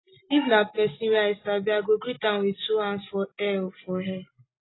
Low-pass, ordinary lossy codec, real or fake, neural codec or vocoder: 7.2 kHz; AAC, 16 kbps; real; none